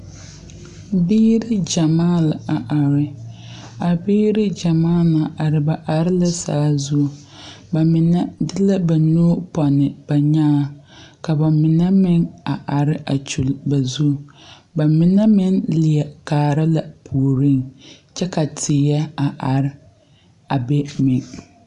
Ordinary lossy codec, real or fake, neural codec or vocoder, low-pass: AAC, 96 kbps; real; none; 10.8 kHz